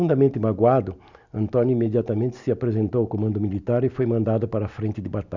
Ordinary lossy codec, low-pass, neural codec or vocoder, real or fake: none; 7.2 kHz; none; real